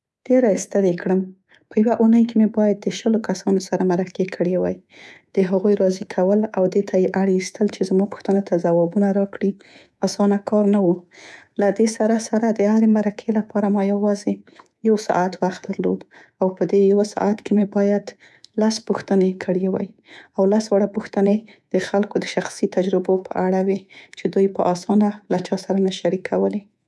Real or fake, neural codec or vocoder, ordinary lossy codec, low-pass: fake; codec, 24 kHz, 3.1 kbps, DualCodec; none; none